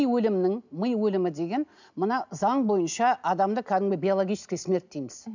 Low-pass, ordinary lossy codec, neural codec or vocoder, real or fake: 7.2 kHz; none; none; real